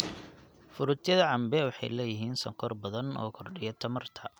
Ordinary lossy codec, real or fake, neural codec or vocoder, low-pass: none; real; none; none